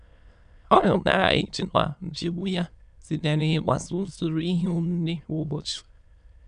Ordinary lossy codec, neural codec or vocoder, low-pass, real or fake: none; autoencoder, 22.05 kHz, a latent of 192 numbers a frame, VITS, trained on many speakers; 9.9 kHz; fake